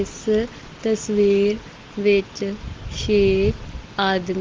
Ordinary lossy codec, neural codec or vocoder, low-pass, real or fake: Opus, 16 kbps; none; 7.2 kHz; real